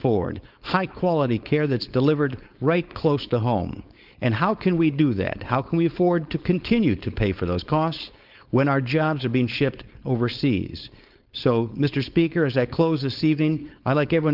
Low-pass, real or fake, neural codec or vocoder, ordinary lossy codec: 5.4 kHz; fake; codec, 16 kHz, 4.8 kbps, FACodec; Opus, 32 kbps